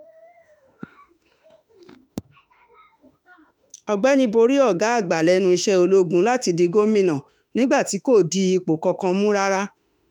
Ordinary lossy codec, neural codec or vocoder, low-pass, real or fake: none; autoencoder, 48 kHz, 32 numbers a frame, DAC-VAE, trained on Japanese speech; 19.8 kHz; fake